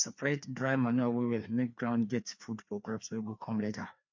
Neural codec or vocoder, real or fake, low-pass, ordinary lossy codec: codec, 16 kHz, 2 kbps, FreqCodec, larger model; fake; 7.2 kHz; MP3, 48 kbps